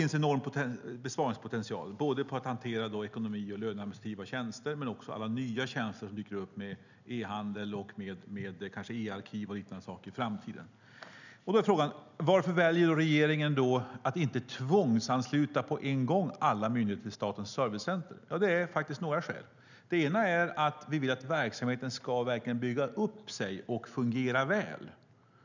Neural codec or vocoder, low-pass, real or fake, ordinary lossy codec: none; 7.2 kHz; real; none